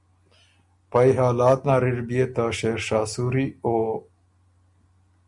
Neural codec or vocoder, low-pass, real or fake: none; 10.8 kHz; real